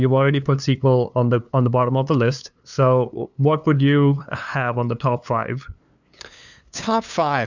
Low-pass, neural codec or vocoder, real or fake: 7.2 kHz; codec, 16 kHz, 2 kbps, FunCodec, trained on LibriTTS, 25 frames a second; fake